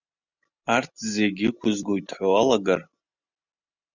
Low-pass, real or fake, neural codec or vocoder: 7.2 kHz; real; none